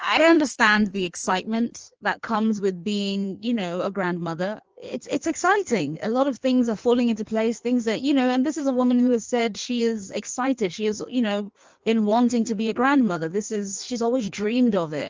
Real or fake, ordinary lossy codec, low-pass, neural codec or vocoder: fake; Opus, 24 kbps; 7.2 kHz; codec, 16 kHz in and 24 kHz out, 1.1 kbps, FireRedTTS-2 codec